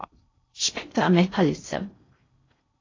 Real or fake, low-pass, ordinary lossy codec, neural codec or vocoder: fake; 7.2 kHz; AAC, 32 kbps; codec, 16 kHz in and 24 kHz out, 0.6 kbps, FocalCodec, streaming, 4096 codes